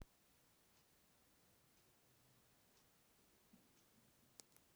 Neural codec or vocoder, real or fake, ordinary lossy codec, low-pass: none; real; none; none